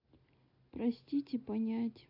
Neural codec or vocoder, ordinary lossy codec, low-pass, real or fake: none; AAC, 48 kbps; 5.4 kHz; real